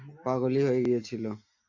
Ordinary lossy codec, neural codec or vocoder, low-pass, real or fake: AAC, 48 kbps; none; 7.2 kHz; real